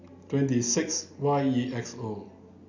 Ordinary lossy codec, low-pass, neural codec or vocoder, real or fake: none; 7.2 kHz; none; real